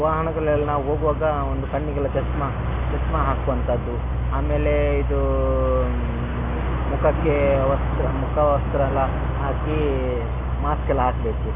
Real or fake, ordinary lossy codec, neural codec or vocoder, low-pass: real; none; none; 3.6 kHz